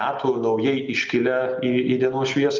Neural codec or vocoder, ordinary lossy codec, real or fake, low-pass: none; Opus, 24 kbps; real; 7.2 kHz